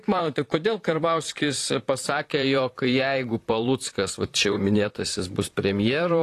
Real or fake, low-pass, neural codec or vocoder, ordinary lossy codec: fake; 14.4 kHz; vocoder, 44.1 kHz, 128 mel bands, Pupu-Vocoder; AAC, 48 kbps